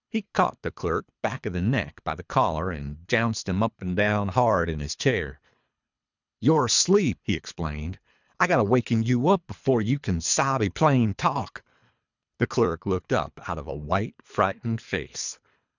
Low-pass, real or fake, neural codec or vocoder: 7.2 kHz; fake; codec, 24 kHz, 3 kbps, HILCodec